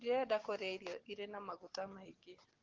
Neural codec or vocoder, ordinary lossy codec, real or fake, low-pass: none; Opus, 16 kbps; real; 7.2 kHz